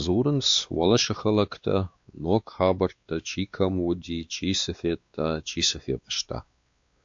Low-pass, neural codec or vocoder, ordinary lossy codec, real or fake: 7.2 kHz; codec, 16 kHz, 2 kbps, X-Codec, WavLM features, trained on Multilingual LibriSpeech; AAC, 64 kbps; fake